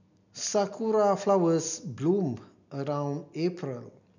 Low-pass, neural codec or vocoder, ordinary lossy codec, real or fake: 7.2 kHz; none; none; real